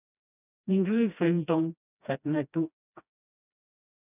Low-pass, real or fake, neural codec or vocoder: 3.6 kHz; fake; codec, 16 kHz, 1 kbps, FreqCodec, smaller model